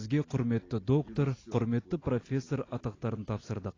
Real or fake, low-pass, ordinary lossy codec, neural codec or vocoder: real; 7.2 kHz; AAC, 32 kbps; none